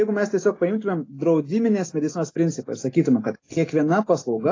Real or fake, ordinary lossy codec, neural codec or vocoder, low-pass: real; AAC, 32 kbps; none; 7.2 kHz